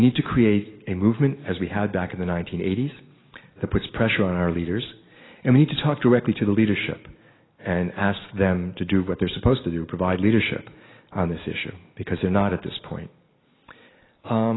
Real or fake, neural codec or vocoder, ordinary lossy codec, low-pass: real; none; AAC, 16 kbps; 7.2 kHz